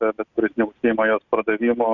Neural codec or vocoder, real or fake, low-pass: none; real; 7.2 kHz